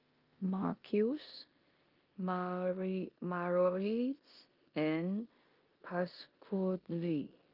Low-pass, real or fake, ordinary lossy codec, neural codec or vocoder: 5.4 kHz; fake; Opus, 32 kbps; codec, 16 kHz in and 24 kHz out, 0.9 kbps, LongCat-Audio-Codec, four codebook decoder